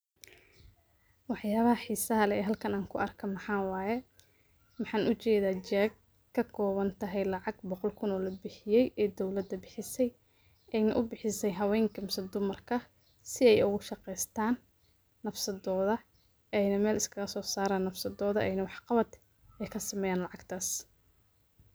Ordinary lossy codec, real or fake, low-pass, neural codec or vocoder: none; real; none; none